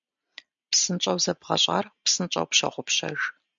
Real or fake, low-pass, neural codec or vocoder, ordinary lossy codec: real; 7.2 kHz; none; MP3, 64 kbps